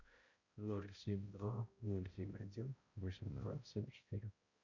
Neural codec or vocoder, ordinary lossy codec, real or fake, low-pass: codec, 16 kHz, 0.5 kbps, X-Codec, HuBERT features, trained on balanced general audio; Opus, 64 kbps; fake; 7.2 kHz